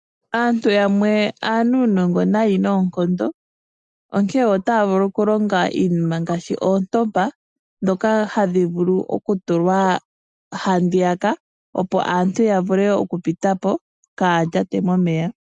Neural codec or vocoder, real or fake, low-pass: none; real; 10.8 kHz